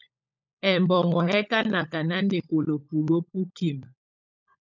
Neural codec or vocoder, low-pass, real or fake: codec, 16 kHz, 16 kbps, FunCodec, trained on LibriTTS, 50 frames a second; 7.2 kHz; fake